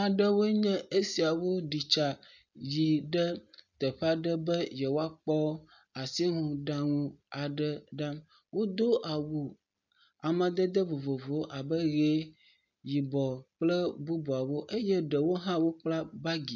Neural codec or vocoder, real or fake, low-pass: none; real; 7.2 kHz